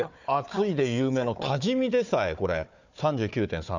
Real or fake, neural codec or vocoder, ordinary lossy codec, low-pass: fake; codec, 16 kHz, 4 kbps, FunCodec, trained on Chinese and English, 50 frames a second; none; 7.2 kHz